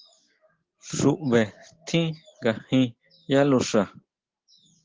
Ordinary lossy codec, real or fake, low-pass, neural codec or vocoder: Opus, 16 kbps; real; 7.2 kHz; none